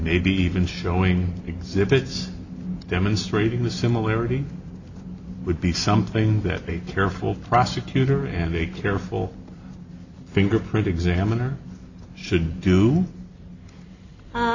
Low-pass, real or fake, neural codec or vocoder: 7.2 kHz; real; none